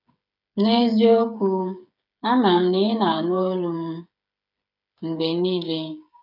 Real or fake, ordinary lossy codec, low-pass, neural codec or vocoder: fake; none; 5.4 kHz; codec, 16 kHz, 8 kbps, FreqCodec, smaller model